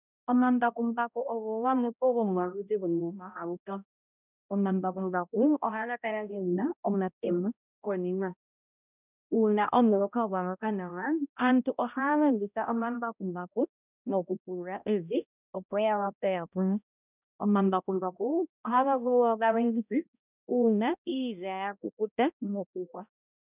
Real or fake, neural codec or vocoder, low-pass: fake; codec, 16 kHz, 0.5 kbps, X-Codec, HuBERT features, trained on balanced general audio; 3.6 kHz